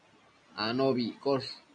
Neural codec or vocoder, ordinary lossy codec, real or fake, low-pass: none; AAC, 64 kbps; real; 9.9 kHz